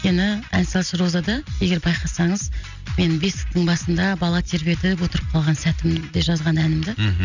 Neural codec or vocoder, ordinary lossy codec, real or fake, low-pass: none; none; real; 7.2 kHz